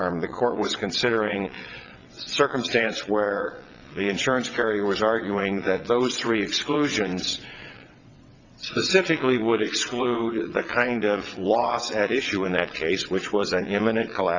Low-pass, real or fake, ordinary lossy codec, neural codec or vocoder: 7.2 kHz; fake; Opus, 64 kbps; vocoder, 22.05 kHz, 80 mel bands, WaveNeXt